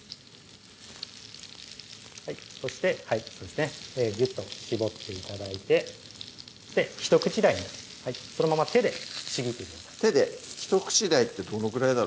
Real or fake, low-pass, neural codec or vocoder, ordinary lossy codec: real; none; none; none